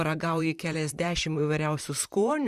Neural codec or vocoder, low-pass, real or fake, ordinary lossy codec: vocoder, 44.1 kHz, 128 mel bands, Pupu-Vocoder; 14.4 kHz; fake; Opus, 64 kbps